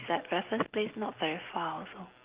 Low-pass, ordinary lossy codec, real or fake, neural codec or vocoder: 3.6 kHz; Opus, 32 kbps; real; none